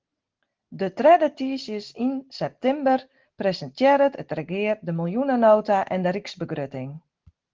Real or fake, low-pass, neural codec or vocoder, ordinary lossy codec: real; 7.2 kHz; none; Opus, 16 kbps